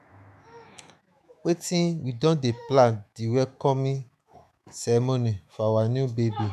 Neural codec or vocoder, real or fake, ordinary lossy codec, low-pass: autoencoder, 48 kHz, 128 numbers a frame, DAC-VAE, trained on Japanese speech; fake; none; 14.4 kHz